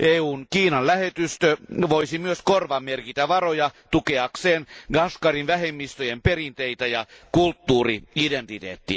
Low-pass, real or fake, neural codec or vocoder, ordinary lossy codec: none; real; none; none